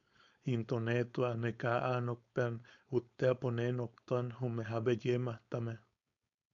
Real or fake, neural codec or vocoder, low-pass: fake; codec, 16 kHz, 4.8 kbps, FACodec; 7.2 kHz